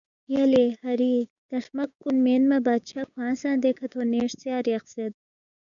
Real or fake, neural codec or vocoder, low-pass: fake; codec, 16 kHz, 6 kbps, DAC; 7.2 kHz